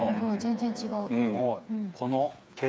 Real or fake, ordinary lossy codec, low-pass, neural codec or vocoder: fake; none; none; codec, 16 kHz, 4 kbps, FreqCodec, smaller model